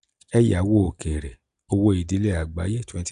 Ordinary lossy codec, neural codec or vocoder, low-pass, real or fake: none; none; 10.8 kHz; real